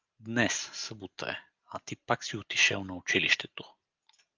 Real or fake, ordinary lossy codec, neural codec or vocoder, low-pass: real; Opus, 24 kbps; none; 7.2 kHz